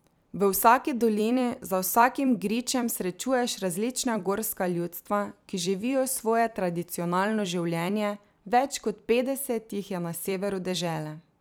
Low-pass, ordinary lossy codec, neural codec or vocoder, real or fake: none; none; vocoder, 44.1 kHz, 128 mel bands every 256 samples, BigVGAN v2; fake